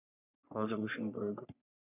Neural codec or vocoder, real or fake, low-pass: codec, 44.1 kHz, 3.4 kbps, Pupu-Codec; fake; 3.6 kHz